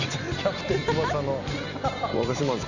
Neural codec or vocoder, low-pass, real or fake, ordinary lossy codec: none; 7.2 kHz; real; none